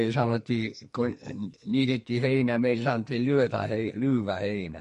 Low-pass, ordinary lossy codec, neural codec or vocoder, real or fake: 14.4 kHz; MP3, 48 kbps; codec, 44.1 kHz, 2.6 kbps, SNAC; fake